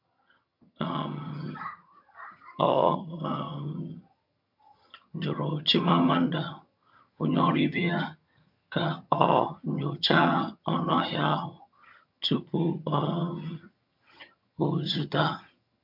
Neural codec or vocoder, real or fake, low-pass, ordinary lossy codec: vocoder, 22.05 kHz, 80 mel bands, HiFi-GAN; fake; 5.4 kHz; MP3, 48 kbps